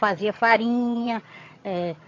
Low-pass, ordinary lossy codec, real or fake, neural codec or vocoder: 7.2 kHz; AAC, 32 kbps; fake; vocoder, 22.05 kHz, 80 mel bands, HiFi-GAN